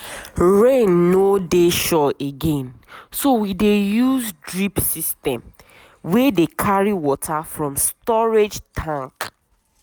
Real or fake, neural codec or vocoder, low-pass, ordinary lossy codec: real; none; none; none